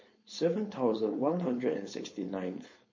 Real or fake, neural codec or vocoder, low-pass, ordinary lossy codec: fake; codec, 16 kHz, 4.8 kbps, FACodec; 7.2 kHz; MP3, 32 kbps